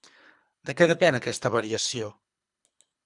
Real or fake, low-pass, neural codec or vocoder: fake; 10.8 kHz; codec, 24 kHz, 3 kbps, HILCodec